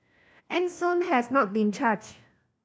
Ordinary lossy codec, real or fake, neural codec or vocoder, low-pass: none; fake; codec, 16 kHz, 1 kbps, FunCodec, trained on LibriTTS, 50 frames a second; none